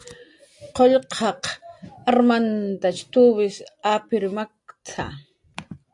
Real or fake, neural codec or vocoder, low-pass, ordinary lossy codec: real; none; 10.8 kHz; AAC, 64 kbps